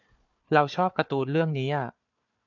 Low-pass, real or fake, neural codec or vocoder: 7.2 kHz; fake; codec, 16 kHz, 4 kbps, FunCodec, trained on Chinese and English, 50 frames a second